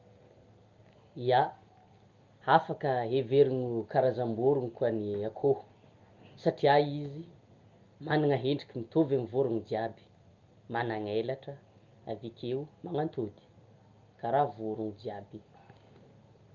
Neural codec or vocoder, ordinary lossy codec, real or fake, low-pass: none; Opus, 24 kbps; real; 7.2 kHz